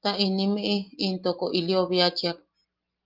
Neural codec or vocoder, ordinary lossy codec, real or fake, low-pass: none; Opus, 32 kbps; real; 5.4 kHz